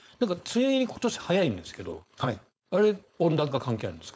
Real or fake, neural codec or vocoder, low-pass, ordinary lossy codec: fake; codec, 16 kHz, 4.8 kbps, FACodec; none; none